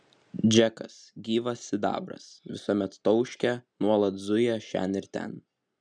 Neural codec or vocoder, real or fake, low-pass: none; real; 9.9 kHz